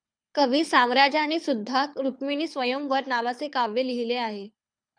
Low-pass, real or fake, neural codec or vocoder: 9.9 kHz; fake; codec, 24 kHz, 6 kbps, HILCodec